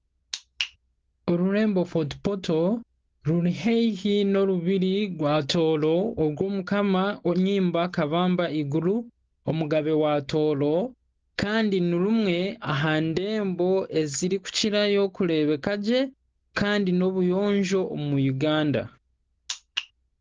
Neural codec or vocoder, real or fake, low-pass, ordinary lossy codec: none; real; 7.2 kHz; Opus, 16 kbps